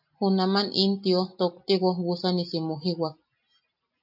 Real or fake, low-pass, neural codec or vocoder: real; 5.4 kHz; none